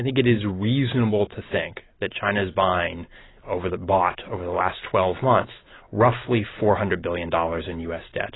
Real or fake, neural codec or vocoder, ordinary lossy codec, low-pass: real; none; AAC, 16 kbps; 7.2 kHz